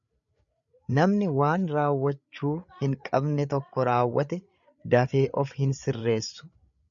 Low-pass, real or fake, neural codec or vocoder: 7.2 kHz; fake; codec, 16 kHz, 8 kbps, FreqCodec, larger model